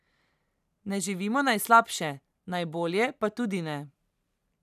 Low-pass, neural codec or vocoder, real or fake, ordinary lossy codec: 14.4 kHz; vocoder, 44.1 kHz, 128 mel bands every 512 samples, BigVGAN v2; fake; none